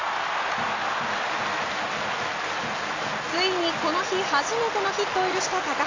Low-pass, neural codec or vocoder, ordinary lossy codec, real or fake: 7.2 kHz; none; AAC, 32 kbps; real